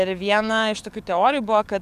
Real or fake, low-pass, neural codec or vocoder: fake; 14.4 kHz; codec, 44.1 kHz, 7.8 kbps, DAC